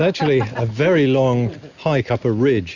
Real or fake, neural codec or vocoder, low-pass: real; none; 7.2 kHz